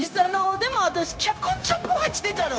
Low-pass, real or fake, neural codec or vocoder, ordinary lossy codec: none; fake; codec, 16 kHz, 0.9 kbps, LongCat-Audio-Codec; none